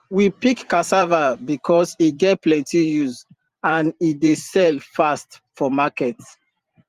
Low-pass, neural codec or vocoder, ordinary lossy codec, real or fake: 14.4 kHz; vocoder, 44.1 kHz, 128 mel bands every 512 samples, BigVGAN v2; Opus, 32 kbps; fake